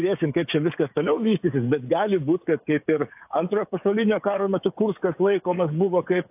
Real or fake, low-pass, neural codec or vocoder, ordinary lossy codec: fake; 3.6 kHz; codec, 16 kHz, 16 kbps, FreqCodec, smaller model; AAC, 32 kbps